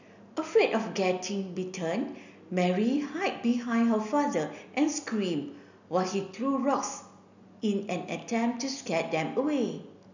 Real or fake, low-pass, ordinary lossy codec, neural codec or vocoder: real; 7.2 kHz; none; none